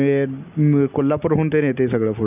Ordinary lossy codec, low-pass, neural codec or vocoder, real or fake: none; 3.6 kHz; none; real